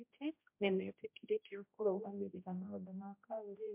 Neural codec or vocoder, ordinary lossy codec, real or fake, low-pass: codec, 16 kHz, 0.5 kbps, X-Codec, HuBERT features, trained on general audio; MP3, 32 kbps; fake; 3.6 kHz